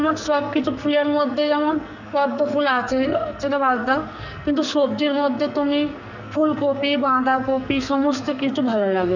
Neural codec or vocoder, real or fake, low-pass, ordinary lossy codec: codec, 44.1 kHz, 2.6 kbps, SNAC; fake; 7.2 kHz; none